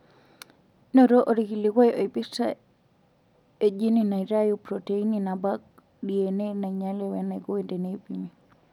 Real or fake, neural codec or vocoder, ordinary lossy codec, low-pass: real; none; none; 19.8 kHz